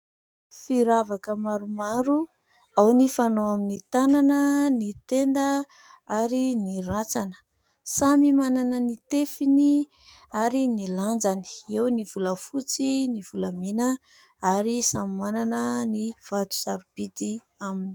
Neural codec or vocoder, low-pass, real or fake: codec, 44.1 kHz, 7.8 kbps, DAC; 19.8 kHz; fake